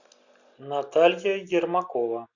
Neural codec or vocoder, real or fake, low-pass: none; real; 7.2 kHz